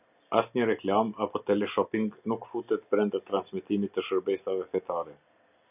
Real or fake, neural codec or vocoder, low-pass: real; none; 3.6 kHz